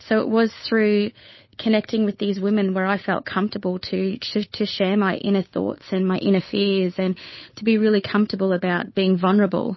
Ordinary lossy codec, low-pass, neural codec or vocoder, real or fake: MP3, 24 kbps; 7.2 kHz; codec, 16 kHz, 8 kbps, FunCodec, trained on Chinese and English, 25 frames a second; fake